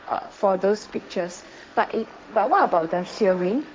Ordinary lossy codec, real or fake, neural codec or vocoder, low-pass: none; fake; codec, 16 kHz, 1.1 kbps, Voila-Tokenizer; none